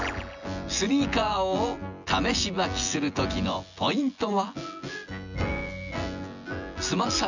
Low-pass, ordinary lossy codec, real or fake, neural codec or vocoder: 7.2 kHz; none; fake; vocoder, 24 kHz, 100 mel bands, Vocos